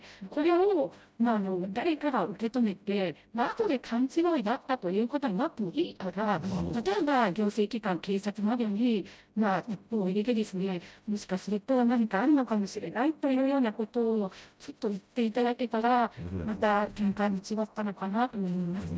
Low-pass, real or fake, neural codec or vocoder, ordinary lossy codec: none; fake; codec, 16 kHz, 0.5 kbps, FreqCodec, smaller model; none